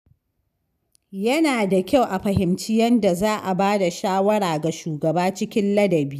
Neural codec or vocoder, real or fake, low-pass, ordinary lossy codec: none; real; 14.4 kHz; none